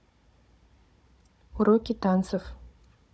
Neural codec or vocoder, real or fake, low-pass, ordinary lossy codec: codec, 16 kHz, 16 kbps, FunCodec, trained on Chinese and English, 50 frames a second; fake; none; none